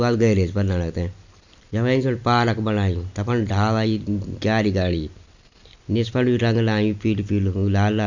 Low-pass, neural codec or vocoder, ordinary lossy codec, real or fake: 7.2 kHz; none; Opus, 64 kbps; real